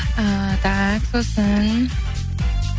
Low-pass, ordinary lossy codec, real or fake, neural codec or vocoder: none; none; real; none